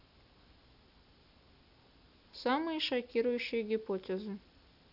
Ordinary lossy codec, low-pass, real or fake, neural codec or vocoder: none; 5.4 kHz; real; none